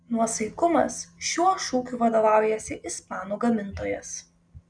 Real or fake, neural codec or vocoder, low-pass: real; none; 9.9 kHz